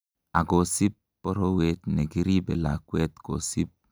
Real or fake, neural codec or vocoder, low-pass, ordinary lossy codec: real; none; none; none